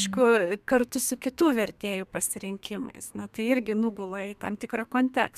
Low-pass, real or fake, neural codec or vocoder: 14.4 kHz; fake; codec, 32 kHz, 1.9 kbps, SNAC